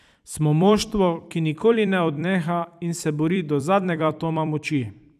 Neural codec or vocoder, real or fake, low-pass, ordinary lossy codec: vocoder, 44.1 kHz, 128 mel bands every 256 samples, BigVGAN v2; fake; 14.4 kHz; none